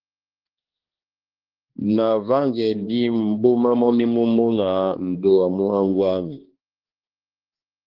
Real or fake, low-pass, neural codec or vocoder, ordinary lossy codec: fake; 5.4 kHz; codec, 16 kHz, 2 kbps, X-Codec, HuBERT features, trained on balanced general audio; Opus, 16 kbps